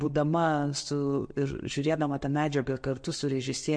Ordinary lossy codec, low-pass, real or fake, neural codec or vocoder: MP3, 48 kbps; 9.9 kHz; real; none